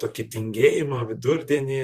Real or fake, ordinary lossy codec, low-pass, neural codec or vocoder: fake; Opus, 64 kbps; 14.4 kHz; vocoder, 44.1 kHz, 128 mel bands, Pupu-Vocoder